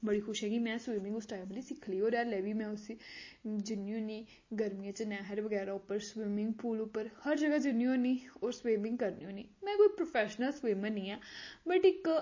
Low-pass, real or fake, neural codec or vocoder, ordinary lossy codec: 7.2 kHz; real; none; MP3, 32 kbps